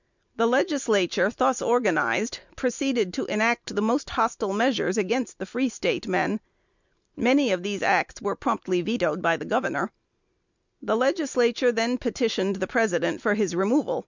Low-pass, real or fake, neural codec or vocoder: 7.2 kHz; real; none